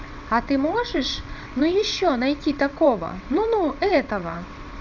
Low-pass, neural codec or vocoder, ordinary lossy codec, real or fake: 7.2 kHz; vocoder, 22.05 kHz, 80 mel bands, WaveNeXt; none; fake